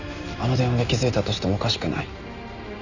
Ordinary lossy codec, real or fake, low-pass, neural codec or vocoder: none; real; 7.2 kHz; none